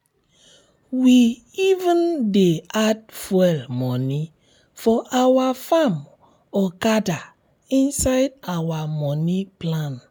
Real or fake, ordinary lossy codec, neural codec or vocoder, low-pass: real; none; none; none